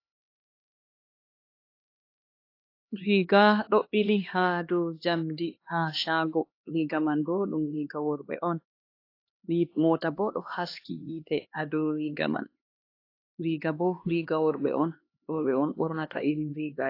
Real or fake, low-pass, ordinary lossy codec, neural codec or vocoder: fake; 5.4 kHz; AAC, 32 kbps; codec, 16 kHz, 2 kbps, X-Codec, HuBERT features, trained on LibriSpeech